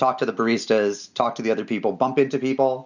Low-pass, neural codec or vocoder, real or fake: 7.2 kHz; none; real